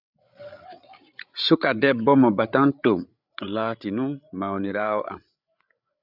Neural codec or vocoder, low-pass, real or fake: codec, 16 kHz, 8 kbps, FreqCodec, larger model; 5.4 kHz; fake